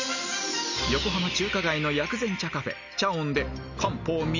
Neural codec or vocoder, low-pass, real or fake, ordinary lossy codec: none; 7.2 kHz; real; none